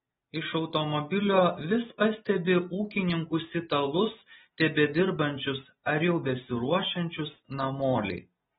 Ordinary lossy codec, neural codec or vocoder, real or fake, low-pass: AAC, 16 kbps; none; real; 7.2 kHz